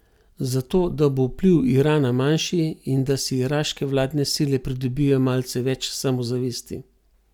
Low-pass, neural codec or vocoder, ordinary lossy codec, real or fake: 19.8 kHz; none; none; real